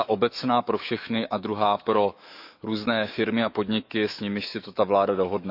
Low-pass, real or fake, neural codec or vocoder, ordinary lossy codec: 5.4 kHz; fake; autoencoder, 48 kHz, 128 numbers a frame, DAC-VAE, trained on Japanese speech; none